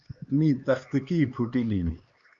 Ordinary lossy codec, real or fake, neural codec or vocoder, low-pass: Opus, 64 kbps; fake; codec, 16 kHz, 2 kbps, X-Codec, HuBERT features, trained on LibriSpeech; 7.2 kHz